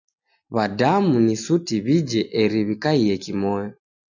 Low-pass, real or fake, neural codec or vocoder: 7.2 kHz; real; none